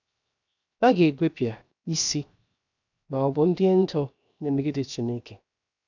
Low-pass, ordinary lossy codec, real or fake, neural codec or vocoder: 7.2 kHz; none; fake; codec, 16 kHz, 0.7 kbps, FocalCodec